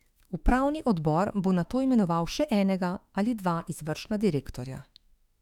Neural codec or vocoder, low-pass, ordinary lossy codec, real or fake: autoencoder, 48 kHz, 32 numbers a frame, DAC-VAE, trained on Japanese speech; 19.8 kHz; none; fake